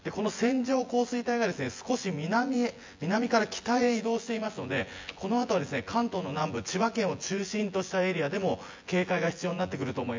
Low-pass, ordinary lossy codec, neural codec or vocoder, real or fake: 7.2 kHz; MP3, 48 kbps; vocoder, 24 kHz, 100 mel bands, Vocos; fake